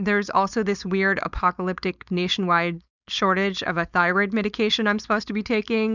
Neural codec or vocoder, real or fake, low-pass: codec, 16 kHz, 4.8 kbps, FACodec; fake; 7.2 kHz